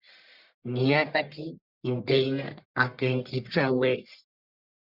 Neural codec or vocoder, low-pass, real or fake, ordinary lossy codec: codec, 44.1 kHz, 1.7 kbps, Pupu-Codec; 5.4 kHz; fake; Opus, 64 kbps